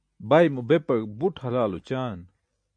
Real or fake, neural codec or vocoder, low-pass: real; none; 9.9 kHz